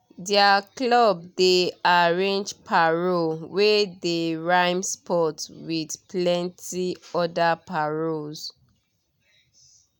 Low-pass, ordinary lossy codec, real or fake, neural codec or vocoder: none; none; real; none